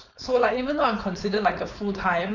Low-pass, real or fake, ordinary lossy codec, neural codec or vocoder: 7.2 kHz; fake; none; codec, 16 kHz, 4.8 kbps, FACodec